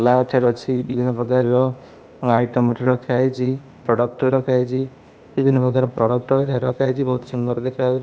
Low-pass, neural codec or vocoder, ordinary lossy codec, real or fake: none; codec, 16 kHz, 0.8 kbps, ZipCodec; none; fake